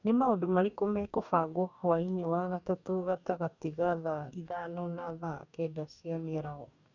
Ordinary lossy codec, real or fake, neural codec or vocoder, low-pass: none; fake; codec, 44.1 kHz, 2.6 kbps, DAC; 7.2 kHz